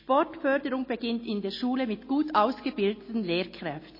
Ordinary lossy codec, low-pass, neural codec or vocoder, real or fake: MP3, 24 kbps; 5.4 kHz; none; real